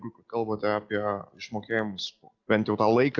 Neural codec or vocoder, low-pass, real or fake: none; 7.2 kHz; real